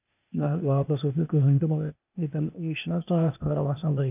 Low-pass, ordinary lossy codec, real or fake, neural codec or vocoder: 3.6 kHz; none; fake; codec, 16 kHz, 0.8 kbps, ZipCodec